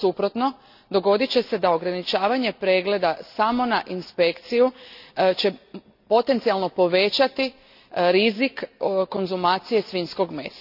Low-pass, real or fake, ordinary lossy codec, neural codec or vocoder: 5.4 kHz; real; none; none